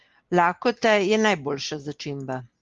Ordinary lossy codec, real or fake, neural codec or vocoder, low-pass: Opus, 32 kbps; real; none; 7.2 kHz